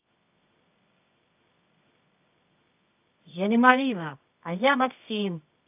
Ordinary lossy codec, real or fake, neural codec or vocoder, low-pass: AAC, 32 kbps; fake; codec, 24 kHz, 0.9 kbps, WavTokenizer, medium music audio release; 3.6 kHz